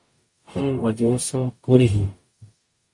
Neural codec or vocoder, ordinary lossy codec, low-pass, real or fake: codec, 44.1 kHz, 0.9 kbps, DAC; MP3, 48 kbps; 10.8 kHz; fake